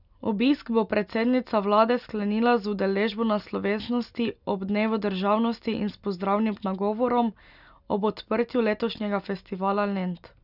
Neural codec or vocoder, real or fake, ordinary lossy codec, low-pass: none; real; none; 5.4 kHz